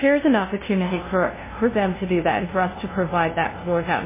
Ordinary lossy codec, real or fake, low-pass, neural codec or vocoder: MP3, 16 kbps; fake; 3.6 kHz; codec, 16 kHz, 0.5 kbps, FunCodec, trained on LibriTTS, 25 frames a second